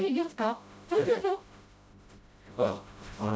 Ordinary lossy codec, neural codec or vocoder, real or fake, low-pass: none; codec, 16 kHz, 0.5 kbps, FreqCodec, smaller model; fake; none